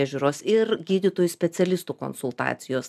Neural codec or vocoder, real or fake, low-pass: none; real; 14.4 kHz